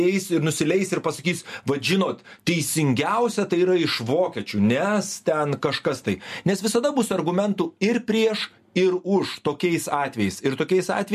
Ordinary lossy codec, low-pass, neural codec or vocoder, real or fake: MP3, 64 kbps; 14.4 kHz; none; real